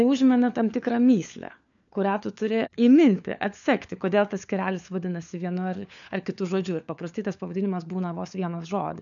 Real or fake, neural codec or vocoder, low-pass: fake; codec, 16 kHz, 4 kbps, FunCodec, trained on LibriTTS, 50 frames a second; 7.2 kHz